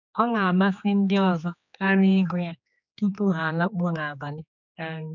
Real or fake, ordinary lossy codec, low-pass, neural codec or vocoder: fake; none; 7.2 kHz; codec, 16 kHz, 2 kbps, X-Codec, HuBERT features, trained on general audio